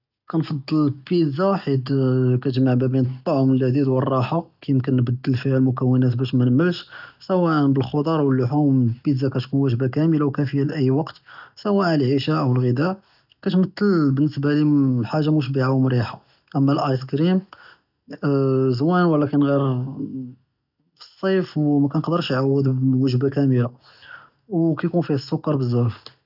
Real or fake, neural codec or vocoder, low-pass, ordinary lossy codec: real; none; 5.4 kHz; none